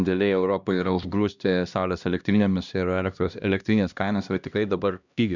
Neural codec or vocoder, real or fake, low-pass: codec, 16 kHz, 1 kbps, X-Codec, HuBERT features, trained on LibriSpeech; fake; 7.2 kHz